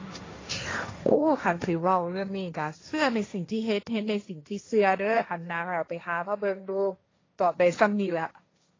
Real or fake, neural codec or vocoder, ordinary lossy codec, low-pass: fake; codec, 16 kHz, 1.1 kbps, Voila-Tokenizer; AAC, 32 kbps; 7.2 kHz